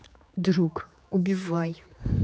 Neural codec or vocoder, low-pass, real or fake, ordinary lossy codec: codec, 16 kHz, 4 kbps, X-Codec, HuBERT features, trained on general audio; none; fake; none